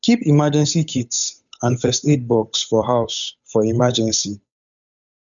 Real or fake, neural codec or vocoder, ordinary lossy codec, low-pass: fake; codec, 16 kHz, 8 kbps, FunCodec, trained on Chinese and English, 25 frames a second; none; 7.2 kHz